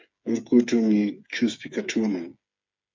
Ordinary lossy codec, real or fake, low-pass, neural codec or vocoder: MP3, 64 kbps; fake; 7.2 kHz; codec, 16 kHz, 8 kbps, FreqCodec, smaller model